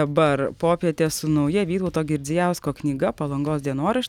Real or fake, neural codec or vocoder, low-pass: real; none; 19.8 kHz